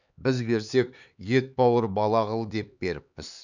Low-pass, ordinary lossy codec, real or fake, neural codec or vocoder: 7.2 kHz; none; fake; codec, 16 kHz, 4 kbps, X-Codec, HuBERT features, trained on LibriSpeech